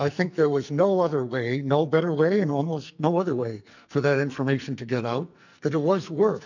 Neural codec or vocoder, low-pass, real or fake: codec, 44.1 kHz, 2.6 kbps, SNAC; 7.2 kHz; fake